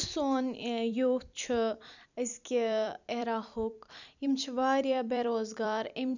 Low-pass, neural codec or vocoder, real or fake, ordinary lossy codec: 7.2 kHz; vocoder, 44.1 kHz, 128 mel bands every 256 samples, BigVGAN v2; fake; none